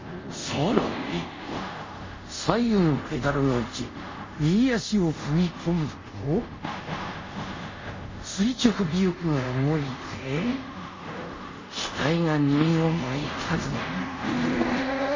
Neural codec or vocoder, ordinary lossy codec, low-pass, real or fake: codec, 24 kHz, 0.5 kbps, DualCodec; MP3, 32 kbps; 7.2 kHz; fake